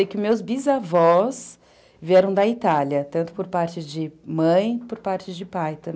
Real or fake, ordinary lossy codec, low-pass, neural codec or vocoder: real; none; none; none